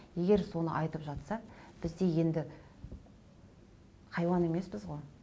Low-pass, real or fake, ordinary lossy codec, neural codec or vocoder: none; real; none; none